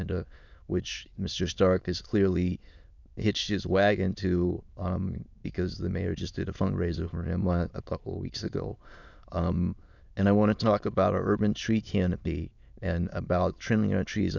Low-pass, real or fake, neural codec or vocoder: 7.2 kHz; fake; autoencoder, 22.05 kHz, a latent of 192 numbers a frame, VITS, trained on many speakers